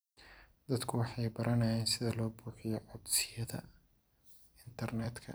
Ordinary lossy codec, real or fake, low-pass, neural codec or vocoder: none; fake; none; vocoder, 44.1 kHz, 128 mel bands every 256 samples, BigVGAN v2